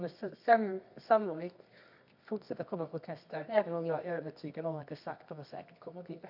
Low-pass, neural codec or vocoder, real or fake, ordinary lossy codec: 5.4 kHz; codec, 24 kHz, 0.9 kbps, WavTokenizer, medium music audio release; fake; none